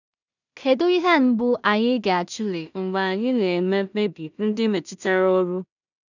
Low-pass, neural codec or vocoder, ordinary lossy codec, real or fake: 7.2 kHz; codec, 16 kHz in and 24 kHz out, 0.4 kbps, LongCat-Audio-Codec, two codebook decoder; none; fake